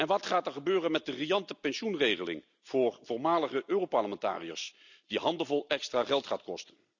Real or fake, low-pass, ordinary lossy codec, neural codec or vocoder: real; 7.2 kHz; none; none